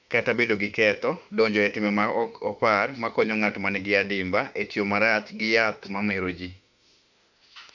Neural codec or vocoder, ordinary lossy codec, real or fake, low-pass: autoencoder, 48 kHz, 32 numbers a frame, DAC-VAE, trained on Japanese speech; none; fake; 7.2 kHz